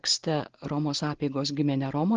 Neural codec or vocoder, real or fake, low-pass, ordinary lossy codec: none; real; 7.2 kHz; Opus, 16 kbps